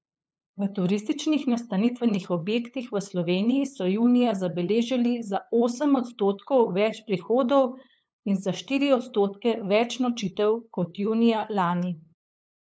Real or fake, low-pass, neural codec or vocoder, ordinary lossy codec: fake; none; codec, 16 kHz, 8 kbps, FunCodec, trained on LibriTTS, 25 frames a second; none